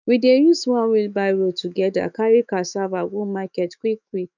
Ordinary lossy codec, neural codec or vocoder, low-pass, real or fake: none; autoencoder, 48 kHz, 128 numbers a frame, DAC-VAE, trained on Japanese speech; 7.2 kHz; fake